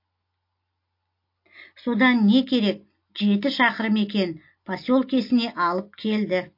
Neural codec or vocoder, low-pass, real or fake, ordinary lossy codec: none; 5.4 kHz; real; MP3, 32 kbps